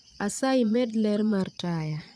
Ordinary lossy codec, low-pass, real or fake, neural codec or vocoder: none; none; fake; vocoder, 22.05 kHz, 80 mel bands, Vocos